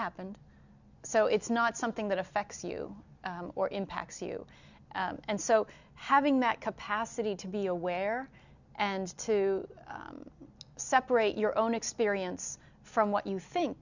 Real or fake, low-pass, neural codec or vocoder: real; 7.2 kHz; none